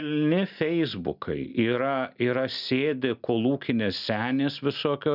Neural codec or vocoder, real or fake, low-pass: none; real; 5.4 kHz